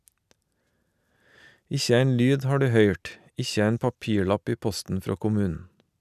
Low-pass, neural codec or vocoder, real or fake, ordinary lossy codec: 14.4 kHz; none; real; none